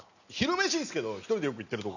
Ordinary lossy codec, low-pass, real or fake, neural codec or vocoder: none; 7.2 kHz; real; none